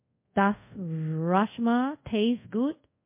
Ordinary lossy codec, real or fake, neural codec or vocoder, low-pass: MP3, 24 kbps; fake; codec, 24 kHz, 0.5 kbps, DualCodec; 3.6 kHz